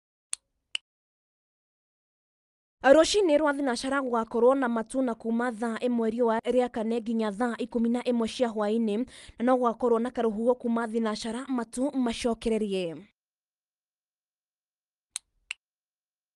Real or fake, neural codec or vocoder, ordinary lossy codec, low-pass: real; none; Opus, 32 kbps; 10.8 kHz